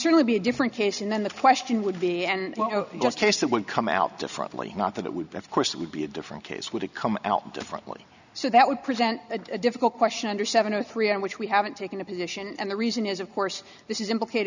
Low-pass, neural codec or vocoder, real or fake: 7.2 kHz; none; real